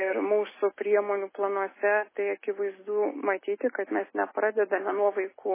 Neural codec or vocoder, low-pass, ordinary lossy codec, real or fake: none; 3.6 kHz; MP3, 16 kbps; real